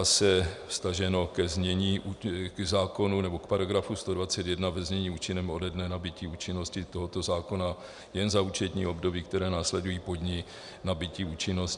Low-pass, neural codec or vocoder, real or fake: 10.8 kHz; none; real